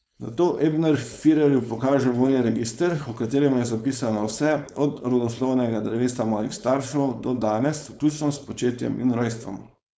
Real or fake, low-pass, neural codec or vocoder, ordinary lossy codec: fake; none; codec, 16 kHz, 4.8 kbps, FACodec; none